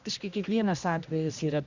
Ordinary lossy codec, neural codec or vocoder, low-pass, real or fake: Opus, 64 kbps; codec, 16 kHz, 1 kbps, X-Codec, HuBERT features, trained on general audio; 7.2 kHz; fake